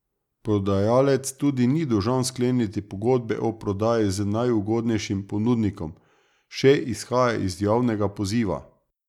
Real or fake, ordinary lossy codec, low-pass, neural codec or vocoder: real; none; 19.8 kHz; none